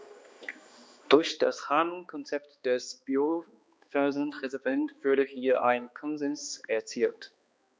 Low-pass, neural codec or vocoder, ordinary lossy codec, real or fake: none; codec, 16 kHz, 2 kbps, X-Codec, HuBERT features, trained on balanced general audio; none; fake